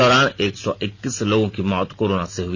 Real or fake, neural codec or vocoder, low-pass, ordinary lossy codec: real; none; none; none